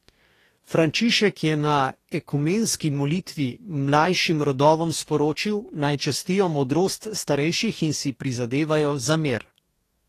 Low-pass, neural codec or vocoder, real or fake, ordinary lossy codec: 14.4 kHz; codec, 44.1 kHz, 2.6 kbps, DAC; fake; AAC, 48 kbps